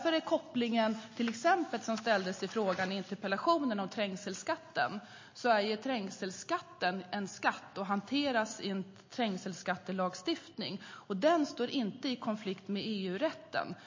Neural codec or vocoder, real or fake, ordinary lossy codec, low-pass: none; real; MP3, 32 kbps; 7.2 kHz